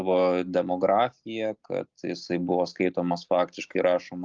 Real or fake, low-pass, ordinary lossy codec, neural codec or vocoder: real; 7.2 kHz; Opus, 32 kbps; none